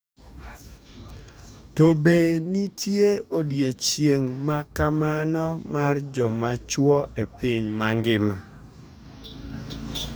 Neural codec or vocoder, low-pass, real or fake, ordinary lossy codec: codec, 44.1 kHz, 2.6 kbps, DAC; none; fake; none